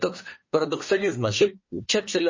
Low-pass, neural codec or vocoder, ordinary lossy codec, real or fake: 7.2 kHz; codec, 24 kHz, 1 kbps, SNAC; MP3, 32 kbps; fake